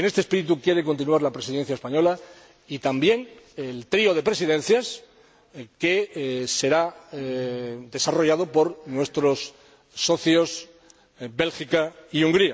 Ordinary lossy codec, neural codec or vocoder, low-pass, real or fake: none; none; none; real